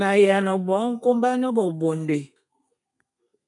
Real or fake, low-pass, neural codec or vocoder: fake; 10.8 kHz; codec, 32 kHz, 1.9 kbps, SNAC